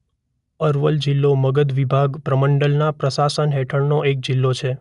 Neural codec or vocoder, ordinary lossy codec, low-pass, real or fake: none; none; 10.8 kHz; real